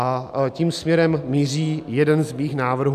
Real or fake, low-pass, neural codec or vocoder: real; 14.4 kHz; none